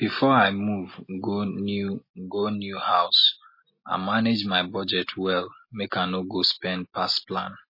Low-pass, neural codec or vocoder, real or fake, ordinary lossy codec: 5.4 kHz; none; real; MP3, 24 kbps